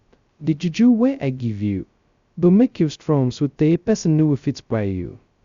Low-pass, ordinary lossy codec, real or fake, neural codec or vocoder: 7.2 kHz; Opus, 64 kbps; fake; codec, 16 kHz, 0.2 kbps, FocalCodec